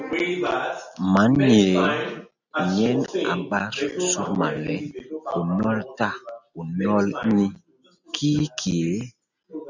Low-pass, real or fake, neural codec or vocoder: 7.2 kHz; real; none